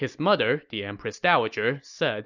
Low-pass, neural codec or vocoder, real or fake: 7.2 kHz; none; real